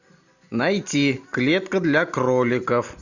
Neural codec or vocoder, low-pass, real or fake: none; 7.2 kHz; real